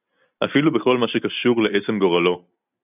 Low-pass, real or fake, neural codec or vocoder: 3.6 kHz; real; none